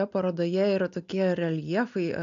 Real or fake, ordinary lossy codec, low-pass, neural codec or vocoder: real; AAC, 64 kbps; 7.2 kHz; none